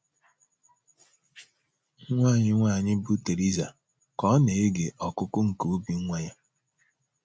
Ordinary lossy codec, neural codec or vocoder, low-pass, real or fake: none; none; none; real